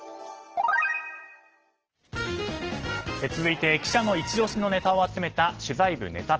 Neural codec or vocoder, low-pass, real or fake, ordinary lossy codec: none; 7.2 kHz; real; Opus, 16 kbps